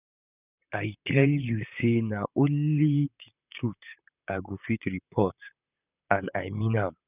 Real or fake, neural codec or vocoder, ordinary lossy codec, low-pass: fake; vocoder, 44.1 kHz, 128 mel bands, Pupu-Vocoder; none; 3.6 kHz